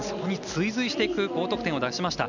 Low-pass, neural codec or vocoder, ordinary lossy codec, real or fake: 7.2 kHz; none; none; real